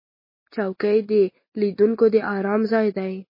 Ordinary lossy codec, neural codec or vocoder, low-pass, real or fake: MP3, 24 kbps; none; 5.4 kHz; real